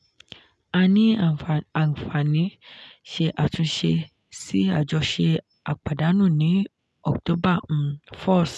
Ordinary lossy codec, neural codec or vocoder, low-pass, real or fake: none; none; none; real